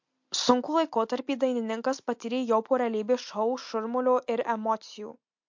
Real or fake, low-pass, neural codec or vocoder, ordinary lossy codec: real; 7.2 kHz; none; MP3, 48 kbps